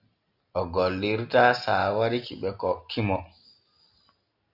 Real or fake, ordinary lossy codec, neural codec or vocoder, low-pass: real; AAC, 48 kbps; none; 5.4 kHz